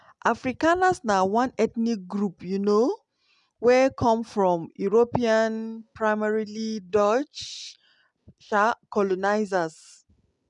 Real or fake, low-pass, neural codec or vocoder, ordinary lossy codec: real; 10.8 kHz; none; none